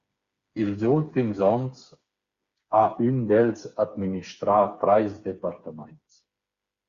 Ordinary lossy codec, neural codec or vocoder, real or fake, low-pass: Opus, 64 kbps; codec, 16 kHz, 4 kbps, FreqCodec, smaller model; fake; 7.2 kHz